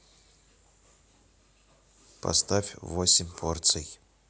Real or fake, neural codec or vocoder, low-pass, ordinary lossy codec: real; none; none; none